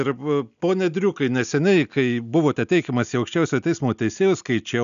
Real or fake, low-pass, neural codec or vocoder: real; 7.2 kHz; none